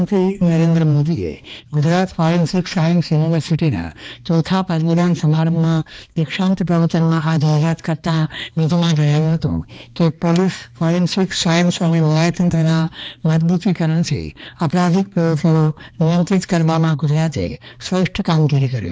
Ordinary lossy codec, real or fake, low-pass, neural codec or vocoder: none; fake; none; codec, 16 kHz, 2 kbps, X-Codec, HuBERT features, trained on balanced general audio